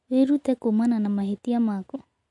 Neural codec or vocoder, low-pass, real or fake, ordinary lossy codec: none; 10.8 kHz; real; MP3, 48 kbps